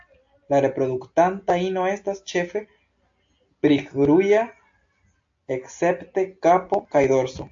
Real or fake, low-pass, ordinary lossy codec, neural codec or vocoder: real; 7.2 kHz; AAC, 64 kbps; none